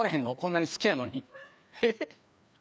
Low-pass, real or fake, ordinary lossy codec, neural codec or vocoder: none; fake; none; codec, 16 kHz, 2 kbps, FreqCodec, larger model